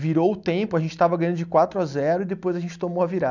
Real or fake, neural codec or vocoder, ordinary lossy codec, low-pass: real; none; none; 7.2 kHz